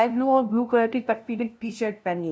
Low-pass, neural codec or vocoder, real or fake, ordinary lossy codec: none; codec, 16 kHz, 0.5 kbps, FunCodec, trained on LibriTTS, 25 frames a second; fake; none